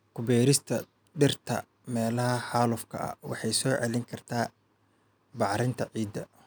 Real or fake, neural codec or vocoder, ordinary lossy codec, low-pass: real; none; none; none